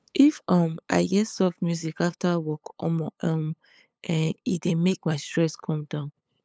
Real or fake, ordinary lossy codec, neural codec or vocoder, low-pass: fake; none; codec, 16 kHz, 8 kbps, FunCodec, trained on LibriTTS, 25 frames a second; none